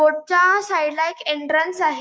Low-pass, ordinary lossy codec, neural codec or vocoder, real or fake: none; none; codec, 16 kHz, 6 kbps, DAC; fake